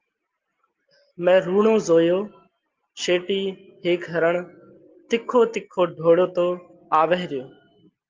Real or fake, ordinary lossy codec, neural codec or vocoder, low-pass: real; Opus, 32 kbps; none; 7.2 kHz